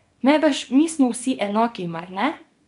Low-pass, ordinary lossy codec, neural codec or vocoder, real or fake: 10.8 kHz; none; codec, 24 kHz, 0.9 kbps, WavTokenizer, small release; fake